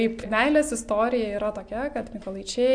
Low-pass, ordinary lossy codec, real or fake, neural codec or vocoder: 9.9 kHz; AAC, 64 kbps; real; none